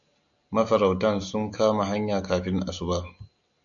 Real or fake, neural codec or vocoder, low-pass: real; none; 7.2 kHz